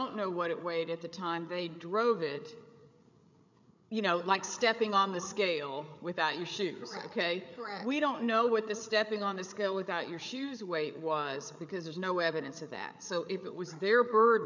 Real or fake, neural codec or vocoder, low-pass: fake; codec, 16 kHz, 8 kbps, FreqCodec, larger model; 7.2 kHz